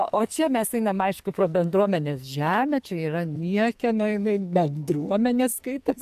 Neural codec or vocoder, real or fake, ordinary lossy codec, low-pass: codec, 44.1 kHz, 2.6 kbps, SNAC; fake; AAC, 96 kbps; 14.4 kHz